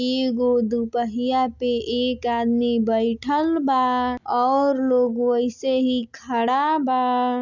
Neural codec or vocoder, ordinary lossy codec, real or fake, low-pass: none; none; real; 7.2 kHz